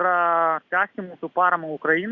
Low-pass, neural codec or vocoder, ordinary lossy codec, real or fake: 7.2 kHz; none; Opus, 64 kbps; real